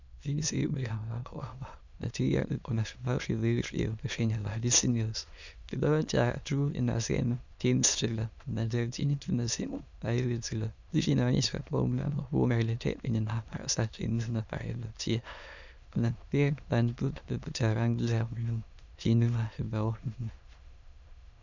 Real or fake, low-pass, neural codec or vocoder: fake; 7.2 kHz; autoencoder, 22.05 kHz, a latent of 192 numbers a frame, VITS, trained on many speakers